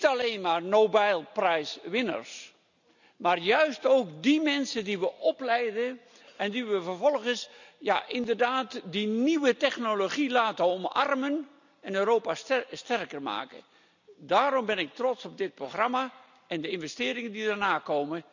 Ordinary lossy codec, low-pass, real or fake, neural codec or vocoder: none; 7.2 kHz; real; none